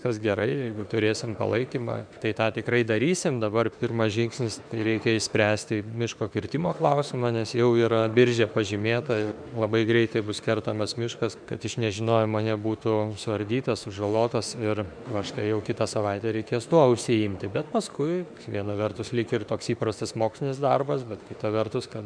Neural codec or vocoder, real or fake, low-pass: autoencoder, 48 kHz, 32 numbers a frame, DAC-VAE, trained on Japanese speech; fake; 9.9 kHz